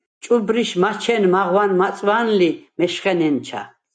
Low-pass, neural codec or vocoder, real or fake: 9.9 kHz; none; real